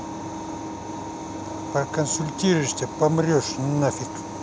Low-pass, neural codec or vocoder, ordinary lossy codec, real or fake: none; none; none; real